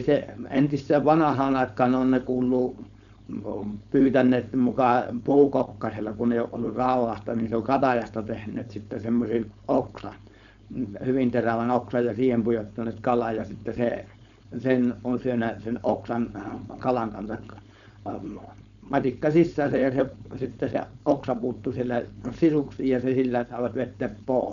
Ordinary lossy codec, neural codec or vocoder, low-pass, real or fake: none; codec, 16 kHz, 4.8 kbps, FACodec; 7.2 kHz; fake